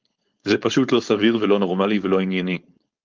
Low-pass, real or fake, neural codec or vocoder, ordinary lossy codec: 7.2 kHz; fake; codec, 16 kHz, 4.8 kbps, FACodec; Opus, 24 kbps